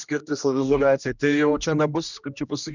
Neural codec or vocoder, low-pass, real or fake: codec, 16 kHz, 1 kbps, X-Codec, HuBERT features, trained on general audio; 7.2 kHz; fake